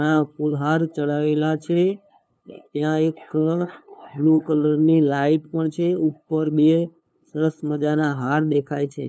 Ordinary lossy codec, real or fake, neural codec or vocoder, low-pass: none; fake; codec, 16 kHz, 2 kbps, FunCodec, trained on LibriTTS, 25 frames a second; none